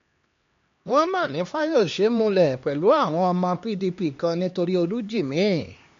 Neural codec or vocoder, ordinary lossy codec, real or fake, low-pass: codec, 16 kHz, 2 kbps, X-Codec, HuBERT features, trained on LibriSpeech; MP3, 48 kbps; fake; 7.2 kHz